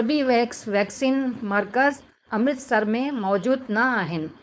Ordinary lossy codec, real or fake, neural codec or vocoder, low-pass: none; fake; codec, 16 kHz, 4.8 kbps, FACodec; none